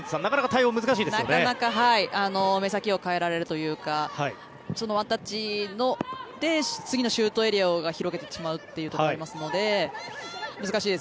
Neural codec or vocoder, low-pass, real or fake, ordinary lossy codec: none; none; real; none